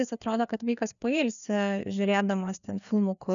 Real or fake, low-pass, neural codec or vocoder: fake; 7.2 kHz; codec, 16 kHz, 2 kbps, FreqCodec, larger model